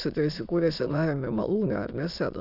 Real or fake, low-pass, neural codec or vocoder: fake; 5.4 kHz; autoencoder, 22.05 kHz, a latent of 192 numbers a frame, VITS, trained on many speakers